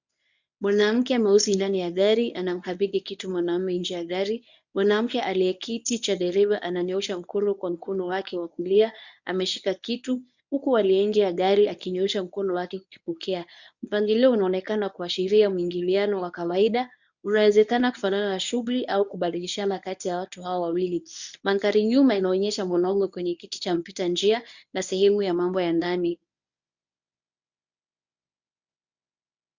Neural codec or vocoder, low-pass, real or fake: codec, 24 kHz, 0.9 kbps, WavTokenizer, medium speech release version 1; 7.2 kHz; fake